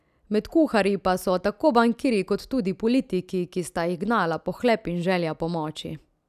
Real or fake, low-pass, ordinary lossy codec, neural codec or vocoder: real; 14.4 kHz; none; none